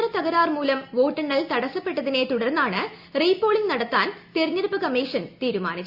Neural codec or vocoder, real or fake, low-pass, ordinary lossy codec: none; real; 5.4 kHz; Opus, 64 kbps